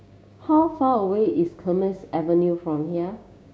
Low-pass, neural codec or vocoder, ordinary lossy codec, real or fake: none; codec, 16 kHz, 6 kbps, DAC; none; fake